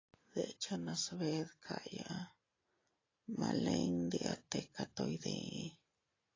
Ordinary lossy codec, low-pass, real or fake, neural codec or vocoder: AAC, 32 kbps; 7.2 kHz; real; none